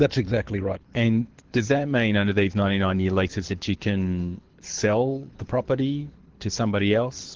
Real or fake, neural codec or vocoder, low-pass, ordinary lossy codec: fake; codec, 24 kHz, 6 kbps, HILCodec; 7.2 kHz; Opus, 16 kbps